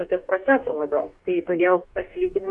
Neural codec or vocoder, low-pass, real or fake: codec, 44.1 kHz, 2.6 kbps, DAC; 10.8 kHz; fake